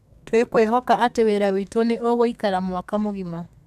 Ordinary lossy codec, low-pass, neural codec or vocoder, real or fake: none; 14.4 kHz; codec, 32 kHz, 1.9 kbps, SNAC; fake